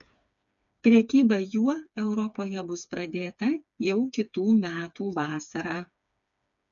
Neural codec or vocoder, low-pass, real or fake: codec, 16 kHz, 4 kbps, FreqCodec, smaller model; 7.2 kHz; fake